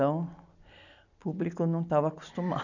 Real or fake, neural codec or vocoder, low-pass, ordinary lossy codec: real; none; 7.2 kHz; none